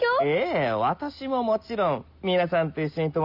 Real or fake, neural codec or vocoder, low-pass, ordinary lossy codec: real; none; 5.4 kHz; none